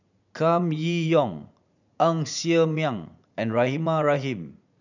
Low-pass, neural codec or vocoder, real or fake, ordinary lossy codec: 7.2 kHz; vocoder, 44.1 kHz, 80 mel bands, Vocos; fake; none